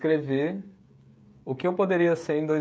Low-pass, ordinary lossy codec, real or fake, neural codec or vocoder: none; none; fake; codec, 16 kHz, 16 kbps, FreqCodec, smaller model